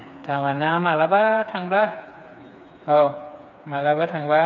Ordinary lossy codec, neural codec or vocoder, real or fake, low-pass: none; codec, 16 kHz, 4 kbps, FreqCodec, smaller model; fake; 7.2 kHz